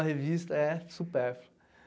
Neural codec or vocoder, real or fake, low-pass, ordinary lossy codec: none; real; none; none